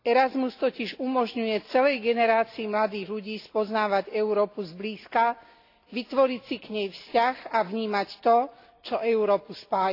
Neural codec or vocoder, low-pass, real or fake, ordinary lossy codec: none; 5.4 kHz; real; AAC, 32 kbps